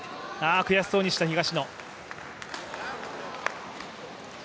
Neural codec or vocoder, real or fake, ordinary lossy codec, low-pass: none; real; none; none